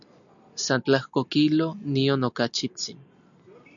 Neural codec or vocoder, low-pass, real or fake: none; 7.2 kHz; real